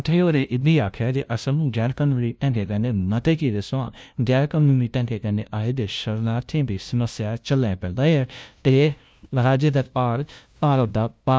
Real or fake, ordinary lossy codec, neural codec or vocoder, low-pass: fake; none; codec, 16 kHz, 0.5 kbps, FunCodec, trained on LibriTTS, 25 frames a second; none